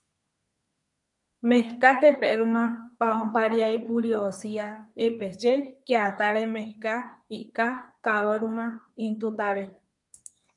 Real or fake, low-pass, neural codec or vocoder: fake; 10.8 kHz; codec, 24 kHz, 1 kbps, SNAC